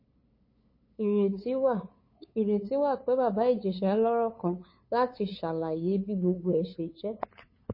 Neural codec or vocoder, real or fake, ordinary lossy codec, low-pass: codec, 16 kHz, 8 kbps, FunCodec, trained on LibriTTS, 25 frames a second; fake; MP3, 24 kbps; 5.4 kHz